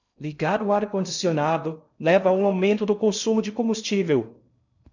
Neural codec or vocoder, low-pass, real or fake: codec, 16 kHz in and 24 kHz out, 0.6 kbps, FocalCodec, streaming, 2048 codes; 7.2 kHz; fake